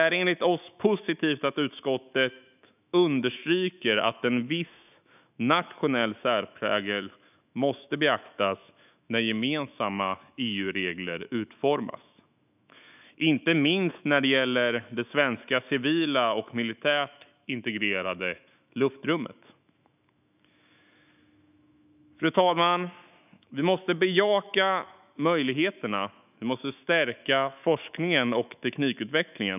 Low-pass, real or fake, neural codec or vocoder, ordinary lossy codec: 3.6 kHz; fake; autoencoder, 48 kHz, 128 numbers a frame, DAC-VAE, trained on Japanese speech; none